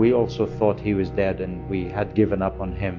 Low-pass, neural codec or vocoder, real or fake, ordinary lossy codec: 7.2 kHz; none; real; MP3, 48 kbps